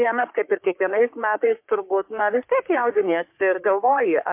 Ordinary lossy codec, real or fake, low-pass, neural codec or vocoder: MP3, 32 kbps; fake; 3.6 kHz; codec, 44.1 kHz, 3.4 kbps, Pupu-Codec